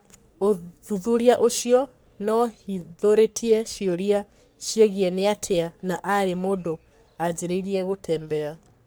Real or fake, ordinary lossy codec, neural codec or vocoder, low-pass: fake; none; codec, 44.1 kHz, 3.4 kbps, Pupu-Codec; none